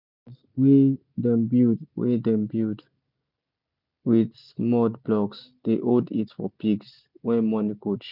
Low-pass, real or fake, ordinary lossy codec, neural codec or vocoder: 5.4 kHz; real; none; none